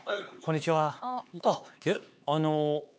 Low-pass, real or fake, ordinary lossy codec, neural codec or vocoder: none; fake; none; codec, 16 kHz, 4 kbps, X-Codec, HuBERT features, trained on LibriSpeech